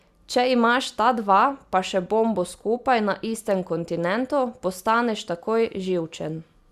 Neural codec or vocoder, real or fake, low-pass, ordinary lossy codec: none; real; 14.4 kHz; Opus, 64 kbps